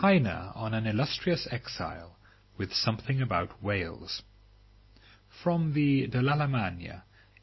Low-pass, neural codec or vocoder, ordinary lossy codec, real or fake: 7.2 kHz; none; MP3, 24 kbps; real